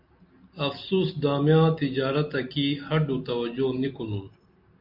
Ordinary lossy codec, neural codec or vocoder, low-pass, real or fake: MP3, 48 kbps; none; 5.4 kHz; real